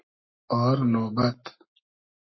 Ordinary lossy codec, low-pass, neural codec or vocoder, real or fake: MP3, 24 kbps; 7.2 kHz; codec, 44.1 kHz, 7.8 kbps, Pupu-Codec; fake